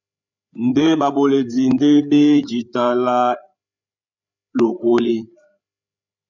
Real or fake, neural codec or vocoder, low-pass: fake; codec, 16 kHz, 8 kbps, FreqCodec, larger model; 7.2 kHz